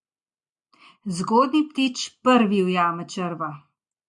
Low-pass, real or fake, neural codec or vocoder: 10.8 kHz; real; none